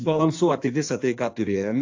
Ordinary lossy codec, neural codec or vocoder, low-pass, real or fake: AAC, 48 kbps; codec, 16 kHz in and 24 kHz out, 1.1 kbps, FireRedTTS-2 codec; 7.2 kHz; fake